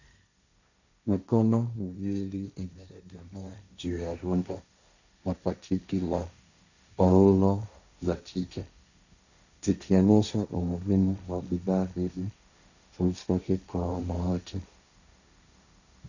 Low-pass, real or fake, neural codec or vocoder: 7.2 kHz; fake; codec, 16 kHz, 1.1 kbps, Voila-Tokenizer